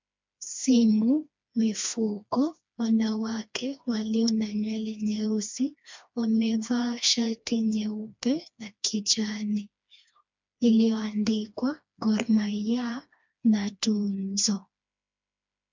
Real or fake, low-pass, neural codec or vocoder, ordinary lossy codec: fake; 7.2 kHz; codec, 16 kHz, 2 kbps, FreqCodec, smaller model; MP3, 64 kbps